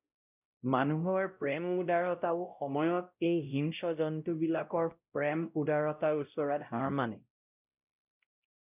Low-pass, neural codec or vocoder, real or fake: 3.6 kHz; codec, 16 kHz, 0.5 kbps, X-Codec, WavLM features, trained on Multilingual LibriSpeech; fake